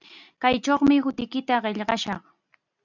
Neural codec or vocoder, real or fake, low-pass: none; real; 7.2 kHz